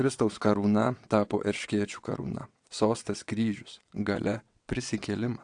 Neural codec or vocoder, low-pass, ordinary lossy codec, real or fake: vocoder, 22.05 kHz, 80 mel bands, WaveNeXt; 9.9 kHz; AAC, 64 kbps; fake